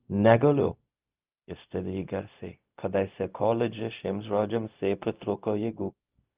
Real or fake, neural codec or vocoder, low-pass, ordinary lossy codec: fake; codec, 16 kHz, 0.4 kbps, LongCat-Audio-Codec; 3.6 kHz; Opus, 24 kbps